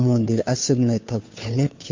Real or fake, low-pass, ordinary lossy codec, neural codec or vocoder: fake; 7.2 kHz; MP3, 48 kbps; codec, 16 kHz, 2 kbps, FunCodec, trained on Chinese and English, 25 frames a second